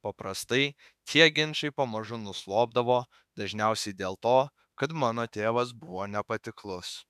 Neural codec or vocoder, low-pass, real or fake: autoencoder, 48 kHz, 32 numbers a frame, DAC-VAE, trained on Japanese speech; 14.4 kHz; fake